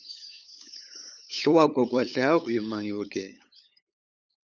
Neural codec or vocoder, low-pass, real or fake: codec, 16 kHz, 8 kbps, FunCodec, trained on LibriTTS, 25 frames a second; 7.2 kHz; fake